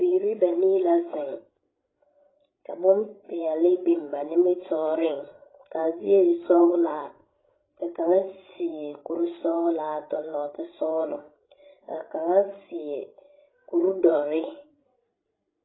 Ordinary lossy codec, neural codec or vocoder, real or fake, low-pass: AAC, 16 kbps; codec, 16 kHz, 16 kbps, FreqCodec, larger model; fake; 7.2 kHz